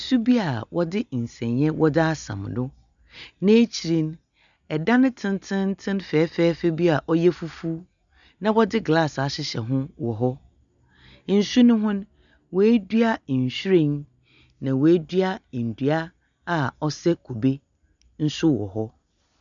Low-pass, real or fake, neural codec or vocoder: 7.2 kHz; real; none